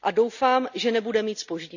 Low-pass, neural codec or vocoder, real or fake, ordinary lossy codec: 7.2 kHz; none; real; none